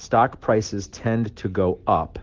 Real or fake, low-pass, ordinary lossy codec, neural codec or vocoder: real; 7.2 kHz; Opus, 16 kbps; none